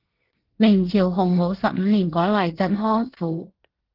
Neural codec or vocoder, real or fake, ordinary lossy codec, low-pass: codec, 24 kHz, 1 kbps, SNAC; fake; Opus, 16 kbps; 5.4 kHz